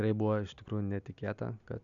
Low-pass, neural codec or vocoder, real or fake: 7.2 kHz; none; real